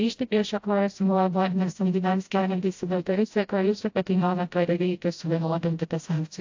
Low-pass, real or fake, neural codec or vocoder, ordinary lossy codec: 7.2 kHz; fake; codec, 16 kHz, 0.5 kbps, FreqCodec, smaller model; AAC, 48 kbps